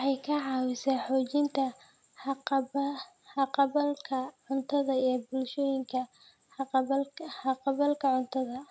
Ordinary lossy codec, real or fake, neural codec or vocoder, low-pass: none; real; none; none